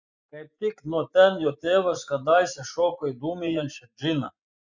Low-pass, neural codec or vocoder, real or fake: 7.2 kHz; vocoder, 24 kHz, 100 mel bands, Vocos; fake